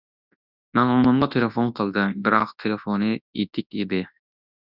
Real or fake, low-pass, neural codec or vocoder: fake; 5.4 kHz; codec, 24 kHz, 0.9 kbps, WavTokenizer, large speech release